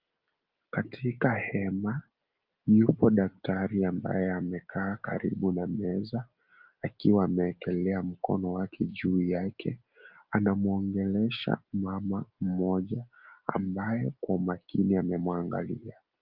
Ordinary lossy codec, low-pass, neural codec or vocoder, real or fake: Opus, 24 kbps; 5.4 kHz; none; real